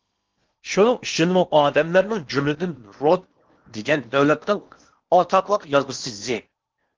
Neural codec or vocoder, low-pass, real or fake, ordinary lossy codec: codec, 16 kHz in and 24 kHz out, 0.8 kbps, FocalCodec, streaming, 65536 codes; 7.2 kHz; fake; Opus, 32 kbps